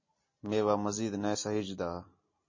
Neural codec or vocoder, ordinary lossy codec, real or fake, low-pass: none; MP3, 32 kbps; real; 7.2 kHz